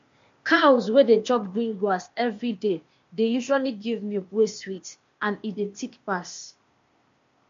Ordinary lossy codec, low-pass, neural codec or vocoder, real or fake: MP3, 48 kbps; 7.2 kHz; codec, 16 kHz, 0.8 kbps, ZipCodec; fake